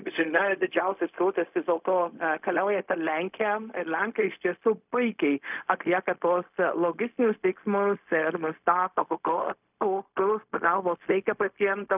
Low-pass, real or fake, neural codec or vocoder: 3.6 kHz; fake; codec, 16 kHz, 0.4 kbps, LongCat-Audio-Codec